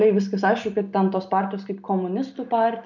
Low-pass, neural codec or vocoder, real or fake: 7.2 kHz; none; real